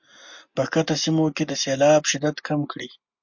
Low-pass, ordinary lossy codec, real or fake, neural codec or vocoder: 7.2 kHz; MP3, 48 kbps; real; none